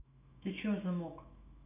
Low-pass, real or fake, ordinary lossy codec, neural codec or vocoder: 3.6 kHz; fake; none; autoencoder, 48 kHz, 128 numbers a frame, DAC-VAE, trained on Japanese speech